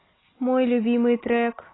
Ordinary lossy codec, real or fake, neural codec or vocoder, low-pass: AAC, 16 kbps; real; none; 7.2 kHz